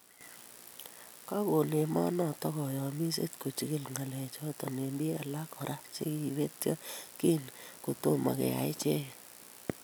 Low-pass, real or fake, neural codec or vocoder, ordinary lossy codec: none; real; none; none